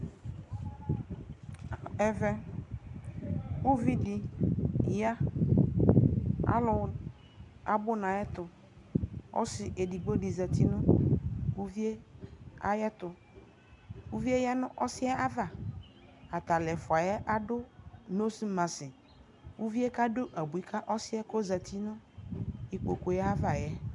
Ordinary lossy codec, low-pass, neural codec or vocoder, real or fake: MP3, 96 kbps; 10.8 kHz; none; real